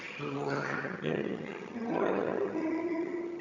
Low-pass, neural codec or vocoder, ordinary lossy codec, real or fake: 7.2 kHz; vocoder, 22.05 kHz, 80 mel bands, HiFi-GAN; none; fake